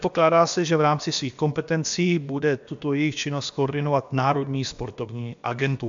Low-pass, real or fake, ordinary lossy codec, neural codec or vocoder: 7.2 kHz; fake; MP3, 64 kbps; codec, 16 kHz, about 1 kbps, DyCAST, with the encoder's durations